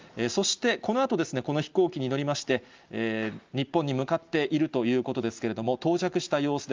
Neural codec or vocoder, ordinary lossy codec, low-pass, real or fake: none; Opus, 32 kbps; 7.2 kHz; real